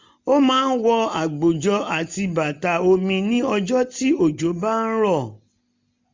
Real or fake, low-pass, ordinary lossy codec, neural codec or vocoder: real; 7.2 kHz; MP3, 64 kbps; none